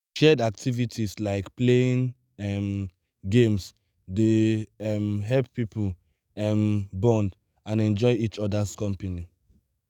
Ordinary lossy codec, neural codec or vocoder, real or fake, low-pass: none; codec, 44.1 kHz, 7.8 kbps, DAC; fake; 19.8 kHz